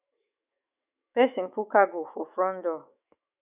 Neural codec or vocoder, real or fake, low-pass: autoencoder, 48 kHz, 128 numbers a frame, DAC-VAE, trained on Japanese speech; fake; 3.6 kHz